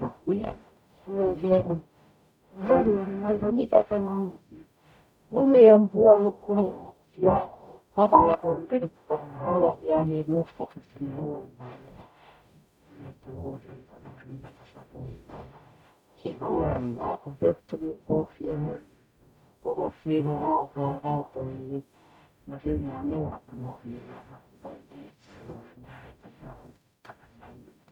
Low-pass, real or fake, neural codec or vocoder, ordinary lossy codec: 19.8 kHz; fake; codec, 44.1 kHz, 0.9 kbps, DAC; none